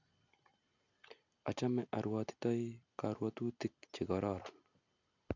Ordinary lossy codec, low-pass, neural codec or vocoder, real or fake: none; 7.2 kHz; none; real